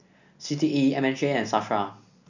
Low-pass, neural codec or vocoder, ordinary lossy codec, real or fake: 7.2 kHz; none; none; real